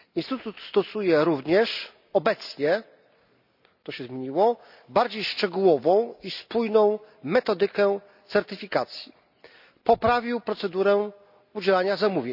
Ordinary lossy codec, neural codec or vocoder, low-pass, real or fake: none; none; 5.4 kHz; real